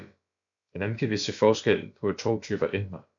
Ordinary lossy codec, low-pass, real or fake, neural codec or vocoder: MP3, 64 kbps; 7.2 kHz; fake; codec, 16 kHz, about 1 kbps, DyCAST, with the encoder's durations